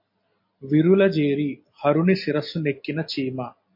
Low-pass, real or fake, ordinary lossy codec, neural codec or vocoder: 5.4 kHz; real; MP3, 32 kbps; none